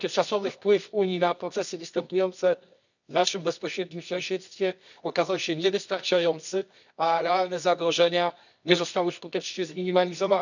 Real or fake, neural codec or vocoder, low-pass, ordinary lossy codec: fake; codec, 24 kHz, 0.9 kbps, WavTokenizer, medium music audio release; 7.2 kHz; none